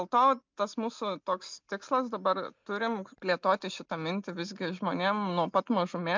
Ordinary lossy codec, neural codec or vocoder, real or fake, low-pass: MP3, 64 kbps; none; real; 7.2 kHz